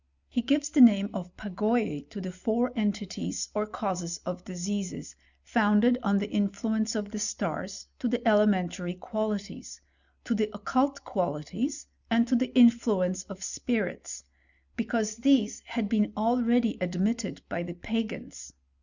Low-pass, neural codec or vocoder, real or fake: 7.2 kHz; none; real